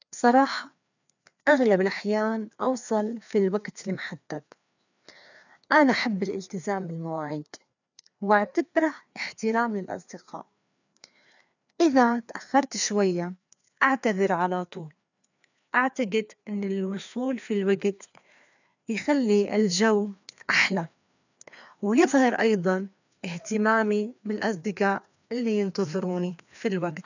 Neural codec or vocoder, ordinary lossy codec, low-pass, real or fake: codec, 16 kHz, 2 kbps, FreqCodec, larger model; none; 7.2 kHz; fake